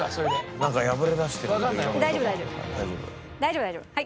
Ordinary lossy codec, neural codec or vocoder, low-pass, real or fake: none; none; none; real